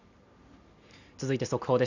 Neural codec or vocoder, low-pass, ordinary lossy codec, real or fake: none; 7.2 kHz; none; real